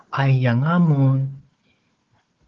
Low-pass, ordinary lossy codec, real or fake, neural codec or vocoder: 7.2 kHz; Opus, 16 kbps; fake; codec, 16 kHz, 4 kbps, FunCodec, trained on Chinese and English, 50 frames a second